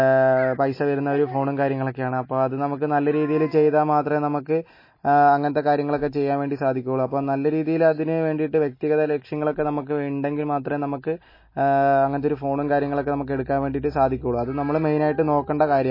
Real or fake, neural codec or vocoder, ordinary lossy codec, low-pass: real; none; MP3, 24 kbps; 5.4 kHz